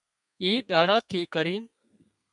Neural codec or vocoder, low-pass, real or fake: codec, 32 kHz, 1.9 kbps, SNAC; 10.8 kHz; fake